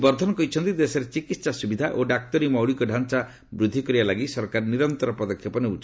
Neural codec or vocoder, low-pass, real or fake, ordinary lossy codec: none; none; real; none